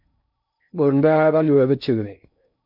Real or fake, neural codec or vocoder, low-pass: fake; codec, 16 kHz in and 24 kHz out, 0.6 kbps, FocalCodec, streaming, 2048 codes; 5.4 kHz